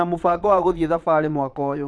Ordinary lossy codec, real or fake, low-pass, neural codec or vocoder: none; fake; none; vocoder, 22.05 kHz, 80 mel bands, Vocos